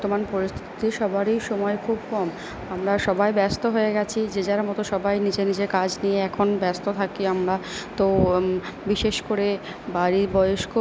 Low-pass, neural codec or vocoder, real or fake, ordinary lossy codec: none; none; real; none